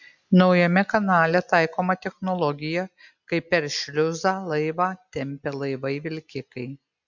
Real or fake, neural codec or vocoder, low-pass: real; none; 7.2 kHz